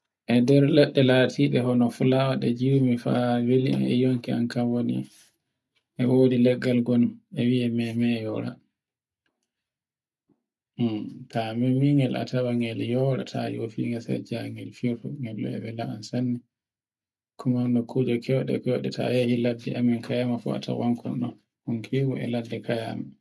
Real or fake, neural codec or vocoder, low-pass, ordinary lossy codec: real; none; none; none